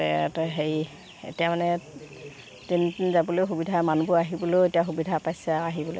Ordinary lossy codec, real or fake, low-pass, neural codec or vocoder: none; real; none; none